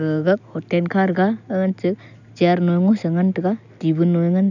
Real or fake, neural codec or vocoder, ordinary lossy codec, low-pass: real; none; none; 7.2 kHz